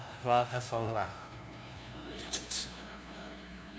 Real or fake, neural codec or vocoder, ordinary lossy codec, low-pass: fake; codec, 16 kHz, 0.5 kbps, FunCodec, trained on LibriTTS, 25 frames a second; none; none